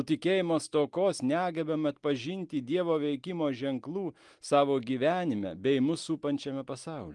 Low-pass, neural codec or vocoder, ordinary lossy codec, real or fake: 10.8 kHz; none; Opus, 24 kbps; real